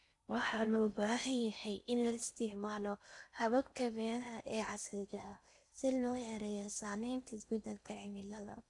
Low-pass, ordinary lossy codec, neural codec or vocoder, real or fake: 10.8 kHz; none; codec, 16 kHz in and 24 kHz out, 0.6 kbps, FocalCodec, streaming, 4096 codes; fake